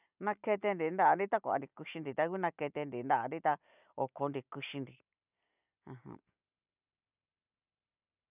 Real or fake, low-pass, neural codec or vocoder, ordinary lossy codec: real; 3.6 kHz; none; none